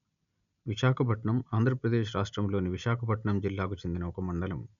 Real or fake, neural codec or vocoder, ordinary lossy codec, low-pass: real; none; none; 7.2 kHz